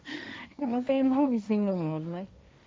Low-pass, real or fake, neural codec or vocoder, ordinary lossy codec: none; fake; codec, 16 kHz, 1.1 kbps, Voila-Tokenizer; none